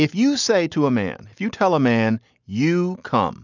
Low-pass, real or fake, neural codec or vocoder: 7.2 kHz; real; none